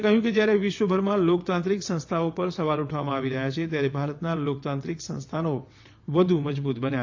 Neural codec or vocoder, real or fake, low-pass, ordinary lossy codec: vocoder, 22.05 kHz, 80 mel bands, WaveNeXt; fake; 7.2 kHz; AAC, 48 kbps